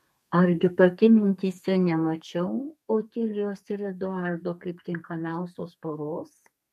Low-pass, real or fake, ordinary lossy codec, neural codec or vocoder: 14.4 kHz; fake; MP3, 64 kbps; codec, 32 kHz, 1.9 kbps, SNAC